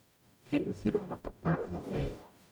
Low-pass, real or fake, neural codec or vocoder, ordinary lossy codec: none; fake; codec, 44.1 kHz, 0.9 kbps, DAC; none